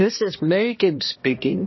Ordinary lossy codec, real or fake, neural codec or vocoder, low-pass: MP3, 24 kbps; fake; codec, 16 kHz, 1 kbps, X-Codec, HuBERT features, trained on balanced general audio; 7.2 kHz